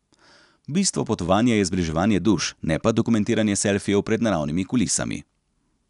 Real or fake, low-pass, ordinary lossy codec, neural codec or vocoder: real; 10.8 kHz; none; none